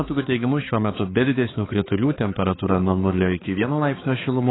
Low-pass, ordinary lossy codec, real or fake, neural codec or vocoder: 7.2 kHz; AAC, 16 kbps; fake; codec, 16 kHz, 4 kbps, X-Codec, HuBERT features, trained on balanced general audio